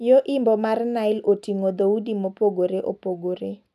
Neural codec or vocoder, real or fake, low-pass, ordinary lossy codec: none; real; 14.4 kHz; none